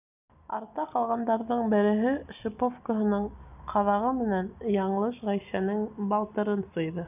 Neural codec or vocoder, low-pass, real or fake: none; 3.6 kHz; real